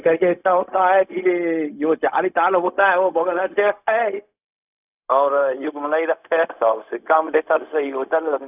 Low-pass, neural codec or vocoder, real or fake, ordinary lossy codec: 3.6 kHz; codec, 16 kHz, 0.4 kbps, LongCat-Audio-Codec; fake; none